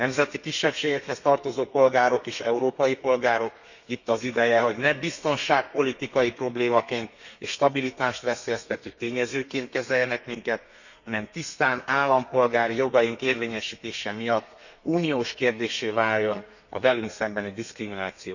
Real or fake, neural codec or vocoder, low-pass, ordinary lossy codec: fake; codec, 32 kHz, 1.9 kbps, SNAC; 7.2 kHz; none